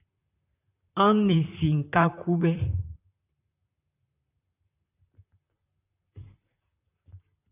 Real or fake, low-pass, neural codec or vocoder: fake; 3.6 kHz; vocoder, 44.1 kHz, 128 mel bands, Pupu-Vocoder